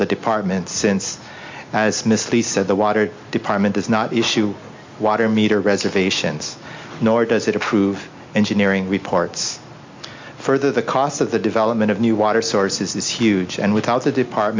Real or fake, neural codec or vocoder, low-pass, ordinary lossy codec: real; none; 7.2 kHz; MP3, 48 kbps